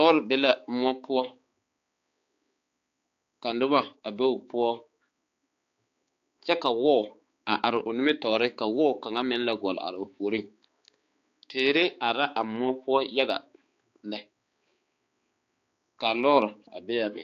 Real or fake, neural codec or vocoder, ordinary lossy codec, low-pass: fake; codec, 16 kHz, 4 kbps, X-Codec, HuBERT features, trained on balanced general audio; AAC, 48 kbps; 7.2 kHz